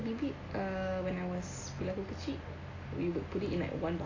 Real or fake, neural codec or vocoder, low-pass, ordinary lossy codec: real; none; 7.2 kHz; AAC, 32 kbps